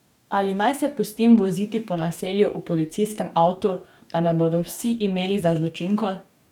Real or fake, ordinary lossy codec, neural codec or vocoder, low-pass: fake; none; codec, 44.1 kHz, 2.6 kbps, DAC; 19.8 kHz